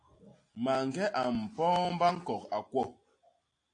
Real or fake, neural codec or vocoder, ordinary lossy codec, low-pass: real; none; AAC, 48 kbps; 9.9 kHz